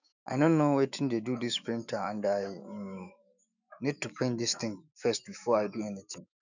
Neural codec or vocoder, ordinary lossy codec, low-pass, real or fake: autoencoder, 48 kHz, 128 numbers a frame, DAC-VAE, trained on Japanese speech; none; 7.2 kHz; fake